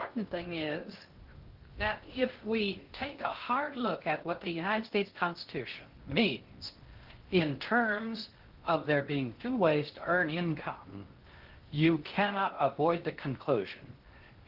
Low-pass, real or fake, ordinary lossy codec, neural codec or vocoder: 5.4 kHz; fake; Opus, 16 kbps; codec, 16 kHz in and 24 kHz out, 0.6 kbps, FocalCodec, streaming, 2048 codes